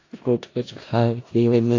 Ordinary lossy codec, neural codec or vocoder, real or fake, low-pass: AAC, 32 kbps; codec, 16 kHz in and 24 kHz out, 0.4 kbps, LongCat-Audio-Codec, four codebook decoder; fake; 7.2 kHz